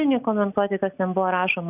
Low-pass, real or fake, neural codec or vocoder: 3.6 kHz; real; none